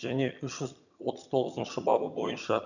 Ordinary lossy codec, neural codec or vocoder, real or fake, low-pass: none; vocoder, 22.05 kHz, 80 mel bands, HiFi-GAN; fake; 7.2 kHz